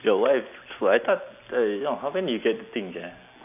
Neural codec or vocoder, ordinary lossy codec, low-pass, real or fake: none; none; 3.6 kHz; real